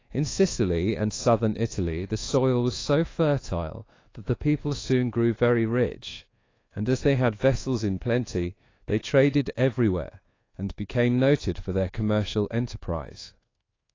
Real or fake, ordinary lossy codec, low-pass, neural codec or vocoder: fake; AAC, 32 kbps; 7.2 kHz; codec, 24 kHz, 1.2 kbps, DualCodec